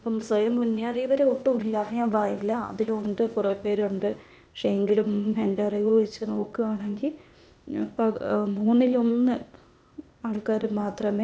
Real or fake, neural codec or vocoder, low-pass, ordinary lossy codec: fake; codec, 16 kHz, 0.8 kbps, ZipCodec; none; none